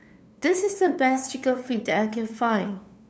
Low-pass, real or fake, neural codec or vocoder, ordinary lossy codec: none; fake; codec, 16 kHz, 2 kbps, FunCodec, trained on LibriTTS, 25 frames a second; none